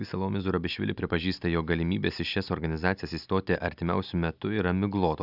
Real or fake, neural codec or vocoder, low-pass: real; none; 5.4 kHz